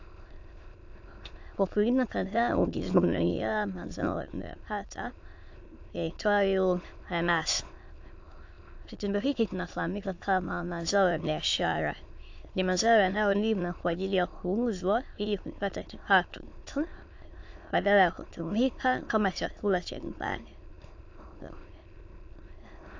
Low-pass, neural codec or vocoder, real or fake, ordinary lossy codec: 7.2 kHz; autoencoder, 22.05 kHz, a latent of 192 numbers a frame, VITS, trained on many speakers; fake; AAC, 48 kbps